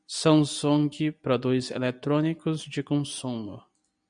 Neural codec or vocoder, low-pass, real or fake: none; 9.9 kHz; real